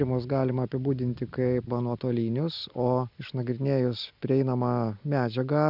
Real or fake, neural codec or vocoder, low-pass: real; none; 5.4 kHz